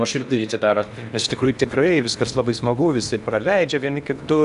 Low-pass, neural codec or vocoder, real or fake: 10.8 kHz; codec, 16 kHz in and 24 kHz out, 0.8 kbps, FocalCodec, streaming, 65536 codes; fake